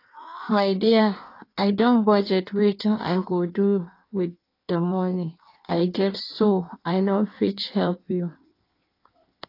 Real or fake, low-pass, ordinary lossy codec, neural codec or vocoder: fake; 5.4 kHz; AAC, 32 kbps; codec, 16 kHz in and 24 kHz out, 1.1 kbps, FireRedTTS-2 codec